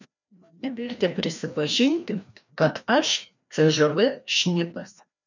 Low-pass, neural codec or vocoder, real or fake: 7.2 kHz; codec, 16 kHz, 1 kbps, FreqCodec, larger model; fake